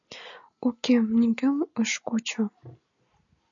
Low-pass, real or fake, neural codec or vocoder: 7.2 kHz; real; none